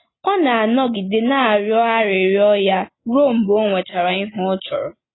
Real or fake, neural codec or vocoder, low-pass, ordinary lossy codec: real; none; 7.2 kHz; AAC, 16 kbps